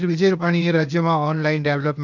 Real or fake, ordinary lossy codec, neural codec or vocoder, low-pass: fake; none; codec, 16 kHz, 0.8 kbps, ZipCodec; 7.2 kHz